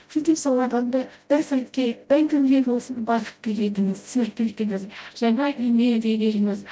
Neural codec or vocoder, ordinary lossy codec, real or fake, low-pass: codec, 16 kHz, 0.5 kbps, FreqCodec, smaller model; none; fake; none